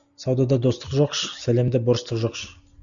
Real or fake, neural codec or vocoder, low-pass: real; none; 7.2 kHz